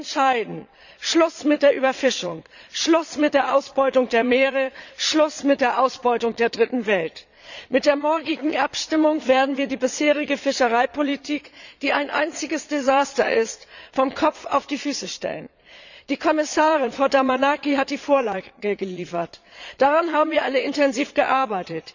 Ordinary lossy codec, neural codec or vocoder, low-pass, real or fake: none; vocoder, 22.05 kHz, 80 mel bands, Vocos; 7.2 kHz; fake